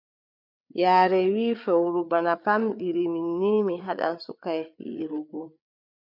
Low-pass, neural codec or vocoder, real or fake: 5.4 kHz; codec, 16 kHz, 8 kbps, FreqCodec, larger model; fake